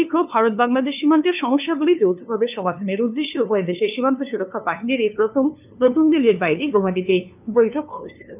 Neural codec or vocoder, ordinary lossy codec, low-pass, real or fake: codec, 16 kHz, 2 kbps, FunCodec, trained on LibriTTS, 25 frames a second; none; 3.6 kHz; fake